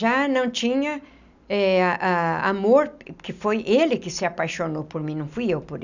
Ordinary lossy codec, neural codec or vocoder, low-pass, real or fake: none; none; 7.2 kHz; real